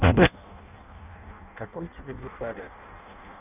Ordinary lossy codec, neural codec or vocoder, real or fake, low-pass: none; codec, 16 kHz in and 24 kHz out, 0.6 kbps, FireRedTTS-2 codec; fake; 3.6 kHz